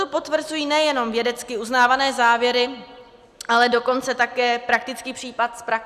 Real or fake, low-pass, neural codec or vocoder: real; 14.4 kHz; none